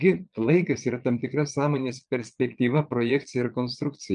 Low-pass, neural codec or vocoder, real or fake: 9.9 kHz; vocoder, 22.05 kHz, 80 mel bands, Vocos; fake